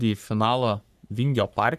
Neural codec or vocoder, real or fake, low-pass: codec, 44.1 kHz, 3.4 kbps, Pupu-Codec; fake; 14.4 kHz